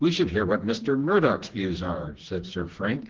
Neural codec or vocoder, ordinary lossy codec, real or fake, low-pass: codec, 16 kHz, 2 kbps, FreqCodec, smaller model; Opus, 16 kbps; fake; 7.2 kHz